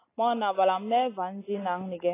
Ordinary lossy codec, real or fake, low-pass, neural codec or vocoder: AAC, 16 kbps; real; 3.6 kHz; none